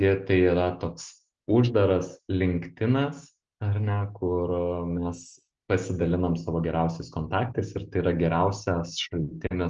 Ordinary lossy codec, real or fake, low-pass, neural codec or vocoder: Opus, 24 kbps; real; 7.2 kHz; none